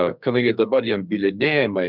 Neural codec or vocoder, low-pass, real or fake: codec, 44.1 kHz, 2.6 kbps, SNAC; 5.4 kHz; fake